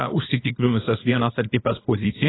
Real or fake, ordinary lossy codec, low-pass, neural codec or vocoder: fake; AAC, 16 kbps; 7.2 kHz; codec, 24 kHz, 0.9 kbps, WavTokenizer, small release